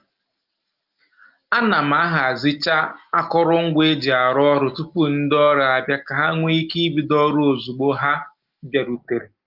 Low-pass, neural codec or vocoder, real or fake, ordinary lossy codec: 5.4 kHz; none; real; Opus, 32 kbps